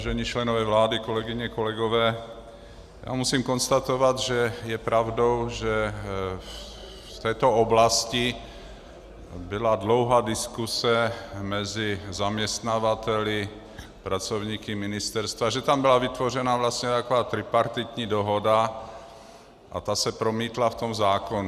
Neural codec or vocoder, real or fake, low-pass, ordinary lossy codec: none; real; 14.4 kHz; Opus, 64 kbps